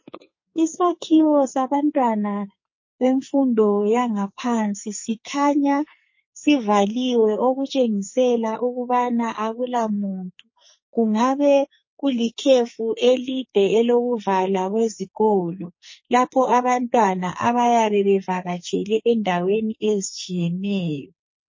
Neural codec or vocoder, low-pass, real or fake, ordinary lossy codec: codec, 44.1 kHz, 2.6 kbps, SNAC; 7.2 kHz; fake; MP3, 32 kbps